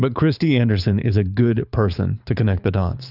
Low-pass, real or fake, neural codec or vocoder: 5.4 kHz; fake; codec, 24 kHz, 6 kbps, HILCodec